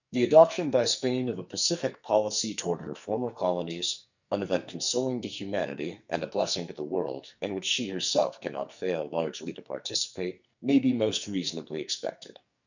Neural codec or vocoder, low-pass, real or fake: codec, 44.1 kHz, 2.6 kbps, SNAC; 7.2 kHz; fake